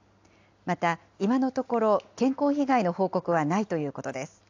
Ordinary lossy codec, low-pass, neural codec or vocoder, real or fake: AAC, 48 kbps; 7.2 kHz; none; real